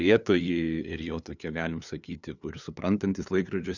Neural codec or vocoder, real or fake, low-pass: codec, 16 kHz, 2 kbps, FunCodec, trained on LibriTTS, 25 frames a second; fake; 7.2 kHz